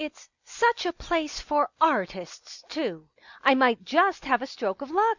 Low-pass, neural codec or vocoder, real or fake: 7.2 kHz; none; real